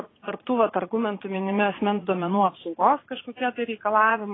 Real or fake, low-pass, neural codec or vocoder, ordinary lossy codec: fake; 7.2 kHz; vocoder, 44.1 kHz, 80 mel bands, Vocos; AAC, 16 kbps